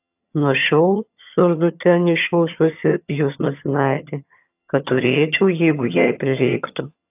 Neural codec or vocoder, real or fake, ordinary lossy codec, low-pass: vocoder, 22.05 kHz, 80 mel bands, HiFi-GAN; fake; AAC, 32 kbps; 3.6 kHz